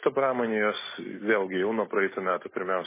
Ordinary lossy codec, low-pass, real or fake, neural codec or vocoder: MP3, 16 kbps; 3.6 kHz; real; none